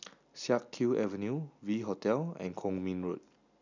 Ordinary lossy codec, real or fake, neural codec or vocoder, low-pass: none; real; none; 7.2 kHz